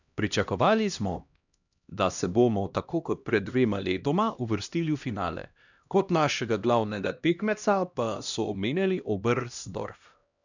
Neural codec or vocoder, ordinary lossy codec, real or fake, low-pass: codec, 16 kHz, 1 kbps, X-Codec, HuBERT features, trained on LibriSpeech; none; fake; 7.2 kHz